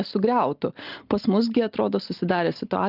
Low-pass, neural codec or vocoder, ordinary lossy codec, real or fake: 5.4 kHz; none; Opus, 32 kbps; real